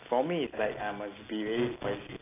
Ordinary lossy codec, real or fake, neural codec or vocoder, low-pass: AAC, 24 kbps; real; none; 3.6 kHz